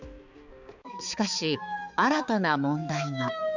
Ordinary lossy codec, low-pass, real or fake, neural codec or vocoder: none; 7.2 kHz; fake; codec, 16 kHz, 4 kbps, X-Codec, HuBERT features, trained on balanced general audio